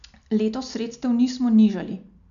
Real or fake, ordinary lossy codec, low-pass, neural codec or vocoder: real; none; 7.2 kHz; none